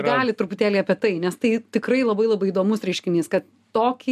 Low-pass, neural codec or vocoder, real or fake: 14.4 kHz; none; real